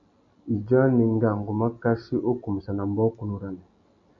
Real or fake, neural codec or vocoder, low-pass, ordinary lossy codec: real; none; 7.2 kHz; MP3, 64 kbps